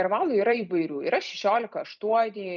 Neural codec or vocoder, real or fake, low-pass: vocoder, 44.1 kHz, 128 mel bands every 256 samples, BigVGAN v2; fake; 7.2 kHz